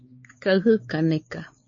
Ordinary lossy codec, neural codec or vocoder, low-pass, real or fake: MP3, 32 kbps; codec, 16 kHz, 4 kbps, X-Codec, WavLM features, trained on Multilingual LibriSpeech; 7.2 kHz; fake